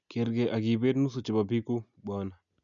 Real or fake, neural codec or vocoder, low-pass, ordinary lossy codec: real; none; 7.2 kHz; none